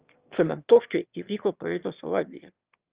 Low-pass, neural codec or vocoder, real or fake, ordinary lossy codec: 3.6 kHz; autoencoder, 22.05 kHz, a latent of 192 numbers a frame, VITS, trained on one speaker; fake; Opus, 32 kbps